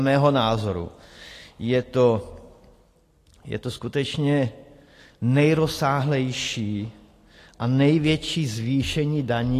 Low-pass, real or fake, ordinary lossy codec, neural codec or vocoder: 14.4 kHz; real; AAC, 48 kbps; none